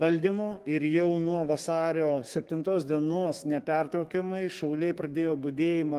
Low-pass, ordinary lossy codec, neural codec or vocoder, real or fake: 14.4 kHz; Opus, 24 kbps; codec, 32 kHz, 1.9 kbps, SNAC; fake